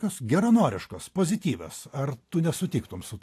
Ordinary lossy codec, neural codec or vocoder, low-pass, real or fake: AAC, 64 kbps; none; 14.4 kHz; real